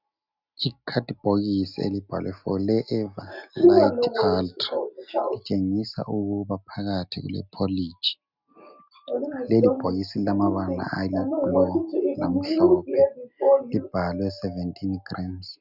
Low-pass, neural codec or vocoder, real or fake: 5.4 kHz; none; real